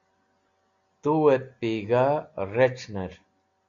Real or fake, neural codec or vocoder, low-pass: real; none; 7.2 kHz